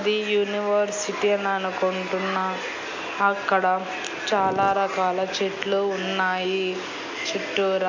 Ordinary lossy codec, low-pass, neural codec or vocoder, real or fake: MP3, 64 kbps; 7.2 kHz; none; real